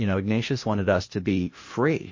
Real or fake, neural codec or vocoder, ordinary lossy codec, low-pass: fake; codec, 16 kHz, 0.8 kbps, ZipCodec; MP3, 32 kbps; 7.2 kHz